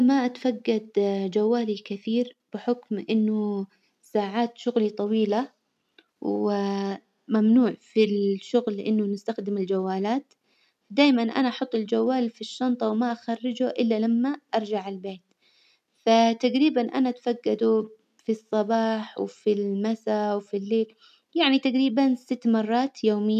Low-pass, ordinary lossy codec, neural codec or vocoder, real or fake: 14.4 kHz; none; none; real